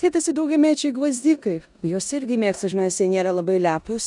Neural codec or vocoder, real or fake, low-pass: codec, 16 kHz in and 24 kHz out, 0.9 kbps, LongCat-Audio-Codec, four codebook decoder; fake; 10.8 kHz